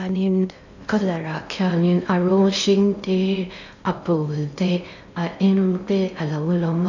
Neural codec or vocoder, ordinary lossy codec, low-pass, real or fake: codec, 16 kHz in and 24 kHz out, 0.6 kbps, FocalCodec, streaming, 2048 codes; none; 7.2 kHz; fake